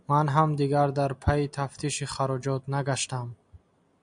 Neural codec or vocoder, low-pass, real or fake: none; 9.9 kHz; real